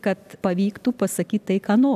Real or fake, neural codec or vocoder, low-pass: real; none; 14.4 kHz